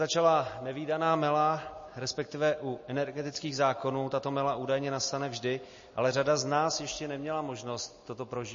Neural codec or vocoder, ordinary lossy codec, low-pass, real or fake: none; MP3, 32 kbps; 7.2 kHz; real